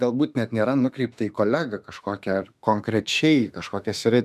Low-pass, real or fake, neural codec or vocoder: 14.4 kHz; fake; autoencoder, 48 kHz, 32 numbers a frame, DAC-VAE, trained on Japanese speech